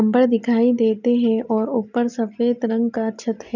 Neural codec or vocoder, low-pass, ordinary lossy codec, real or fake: none; 7.2 kHz; none; real